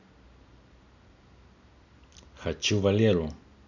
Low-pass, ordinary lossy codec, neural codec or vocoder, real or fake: 7.2 kHz; none; none; real